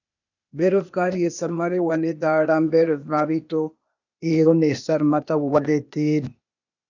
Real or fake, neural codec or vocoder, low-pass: fake; codec, 16 kHz, 0.8 kbps, ZipCodec; 7.2 kHz